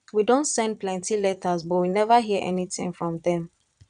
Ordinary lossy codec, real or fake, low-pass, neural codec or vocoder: none; fake; 9.9 kHz; vocoder, 22.05 kHz, 80 mel bands, Vocos